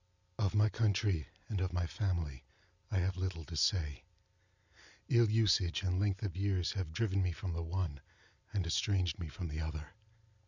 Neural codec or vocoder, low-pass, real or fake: none; 7.2 kHz; real